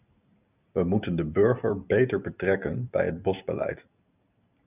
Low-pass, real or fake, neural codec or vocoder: 3.6 kHz; real; none